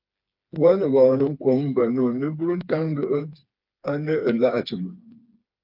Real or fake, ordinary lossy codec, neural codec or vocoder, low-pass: fake; Opus, 24 kbps; codec, 16 kHz, 4 kbps, FreqCodec, smaller model; 5.4 kHz